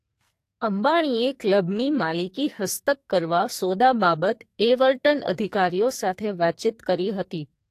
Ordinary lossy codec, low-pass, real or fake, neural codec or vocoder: AAC, 64 kbps; 14.4 kHz; fake; codec, 44.1 kHz, 2.6 kbps, SNAC